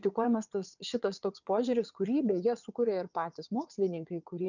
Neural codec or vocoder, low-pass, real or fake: vocoder, 22.05 kHz, 80 mel bands, WaveNeXt; 7.2 kHz; fake